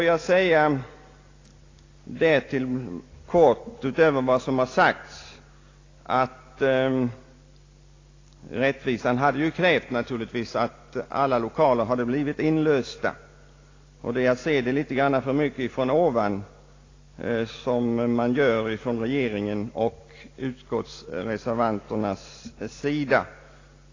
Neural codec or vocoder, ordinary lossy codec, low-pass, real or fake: none; AAC, 32 kbps; 7.2 kHz; real